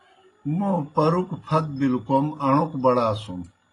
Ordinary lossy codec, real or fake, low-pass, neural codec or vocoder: AAC, 32 kbps; real; 10.8 kHz; none